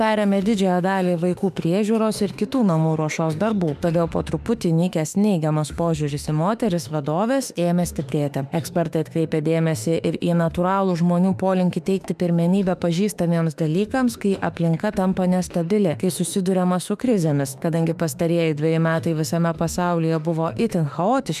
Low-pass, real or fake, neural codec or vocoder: 14.4 kHz; fake; autoencoder, 48 kHz, 32 numbers a frame, DAC-VAE, trained on Japanese speech